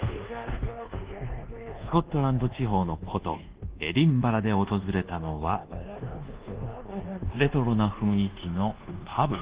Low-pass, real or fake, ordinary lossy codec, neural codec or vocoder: 3.6 kHz; fake; Opus, 16 kbps; codec, 24 kHz, 1.2 kbps, DualCodec